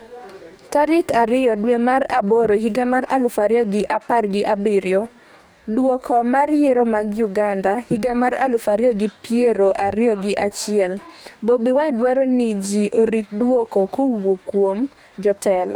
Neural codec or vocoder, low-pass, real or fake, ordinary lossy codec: codec, 44.1 kHz, 2.6 kbps, DAC; none; fake; none